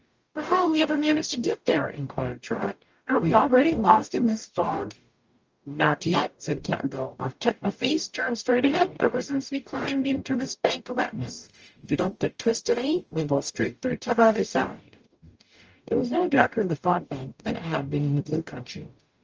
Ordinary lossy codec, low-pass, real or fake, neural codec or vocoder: Opus, 24 kbps; 7.2 kHz; fake; codec, 44.1 kHz, 0.9 kbps, DAC